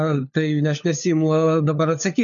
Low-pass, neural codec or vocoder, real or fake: 7.2 kHz; codec, 16 kHz, 4 kbps, FunCodec, trained on LibriTTS, 50 frames a second; fake